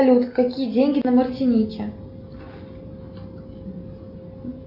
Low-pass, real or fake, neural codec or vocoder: 5.4 kHz; real; none